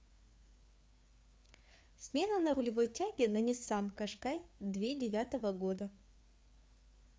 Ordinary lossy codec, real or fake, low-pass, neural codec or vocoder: none; fake; none; codec, 16 kHz, 4 kbps, FreqCodec, larger model